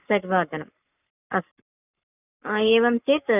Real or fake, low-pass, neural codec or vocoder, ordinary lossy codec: fake; 3.6 kHz; codec, 44.1 kHz, 7.8 kbps, Pupu-Codec; none